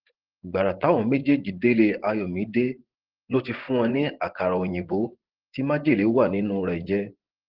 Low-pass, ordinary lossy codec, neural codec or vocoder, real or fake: 5.4 kHz; Opus, 16 kbps; vocoder, 24 kHz, 100 mel bands, Vocos; fake